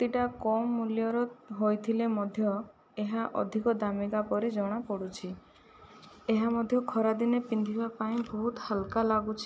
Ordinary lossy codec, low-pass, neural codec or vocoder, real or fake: none; none; none; real